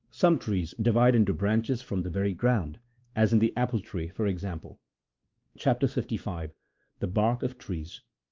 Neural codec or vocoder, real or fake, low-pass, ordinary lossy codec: codec, 16 kHz, 2 kbps, X-Codec, WavLM features, trained on Multilingual LibriSpeech; fake; 7.2 kHz; Opus, 24 kbps